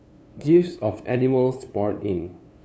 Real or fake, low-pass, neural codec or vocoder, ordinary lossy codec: fake; none; codec, 16 kHz, 2 kbps, FunCodec, trained on LibriTTS, 25 frames a second; none